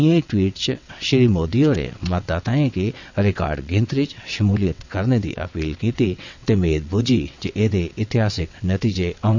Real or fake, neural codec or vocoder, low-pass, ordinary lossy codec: fake; vocoder, 22.05 kHz, 80 mel bands, WaveNeXt; 7.2 kHz; none